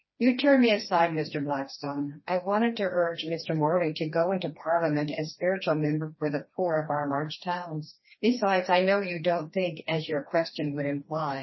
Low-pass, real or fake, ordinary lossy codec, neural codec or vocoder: 7.2 kHz; fake; MP3, 24 kbps; codec, 16 kHz, 2 kbps, FreqCodec, smaller model